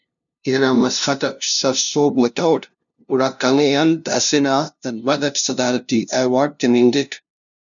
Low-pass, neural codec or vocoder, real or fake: 7.2 kHz; codec, 16 kHz, 0.5 kbps, FunCodec, trained on LibriTTS, 25 frames a second; fake